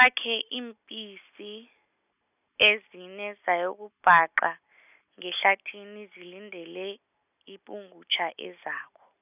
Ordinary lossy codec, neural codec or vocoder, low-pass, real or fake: none; none; 3.6 kHz; real